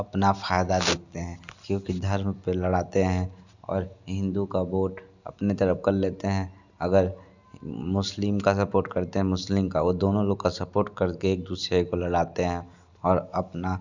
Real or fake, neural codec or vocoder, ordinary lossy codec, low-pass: real; none; none; 7.2 kHz